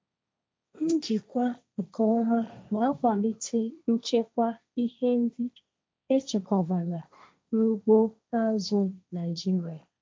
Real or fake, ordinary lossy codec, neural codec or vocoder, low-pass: fake; none; codec, 16 kHz, 1.1 kbps, Voila-Tokenizer; none